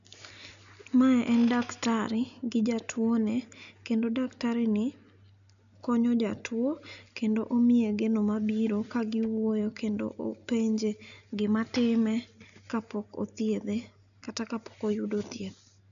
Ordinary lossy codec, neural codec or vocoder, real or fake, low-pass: none; none; real; 7.2 kHz